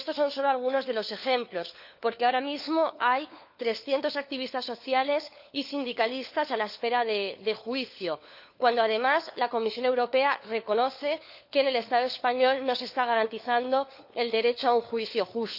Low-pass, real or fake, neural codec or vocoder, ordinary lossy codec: 5.4 kHz; fake; codec, 16 kHz, 4 kbps, FunCodec, trained on LibriTTS, 50 frames a second; none